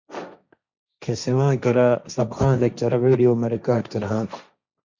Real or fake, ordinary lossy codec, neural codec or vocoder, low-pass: fake; Opus, 64 kbps; codec, 16 kHz, 1.1 kbps, Voila-Tokenizer; 7.2 kHz